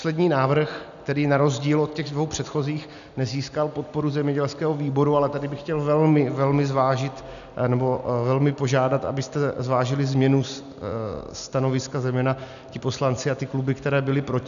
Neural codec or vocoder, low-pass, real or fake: none; 7.2 kHz; real